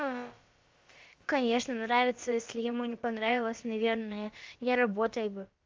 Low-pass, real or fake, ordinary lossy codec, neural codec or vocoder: 7.2 kHz; fake; Opus, 32 kbps; codec, 16 kHz, about 1 kbps, DyCAST, with the encoder's durations